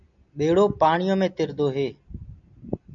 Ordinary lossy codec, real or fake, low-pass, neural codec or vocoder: MP3, 96 kbps; real; 7.2 kHz; none